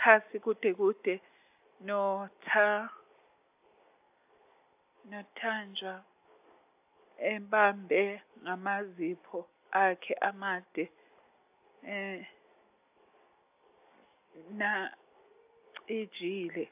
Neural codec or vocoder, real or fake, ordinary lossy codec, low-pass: none; real; AAC, 32 kbps; 3.6 kHz